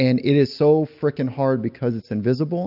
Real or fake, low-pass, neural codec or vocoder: real; 5.4 kHz; none